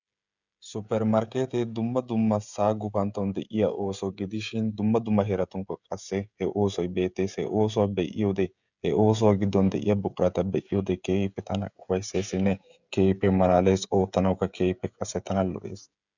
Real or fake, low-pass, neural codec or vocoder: fake; 7.2 kHz; codec, 16 kHz, 16 kbps, FreqCodec, smaller model